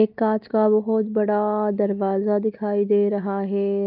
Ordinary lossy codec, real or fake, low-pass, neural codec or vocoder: Opus, 24 kbps; real; 5.4 kHz; none